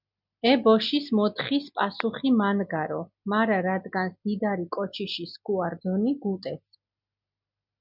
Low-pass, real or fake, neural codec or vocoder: 5.4 kHz; real; none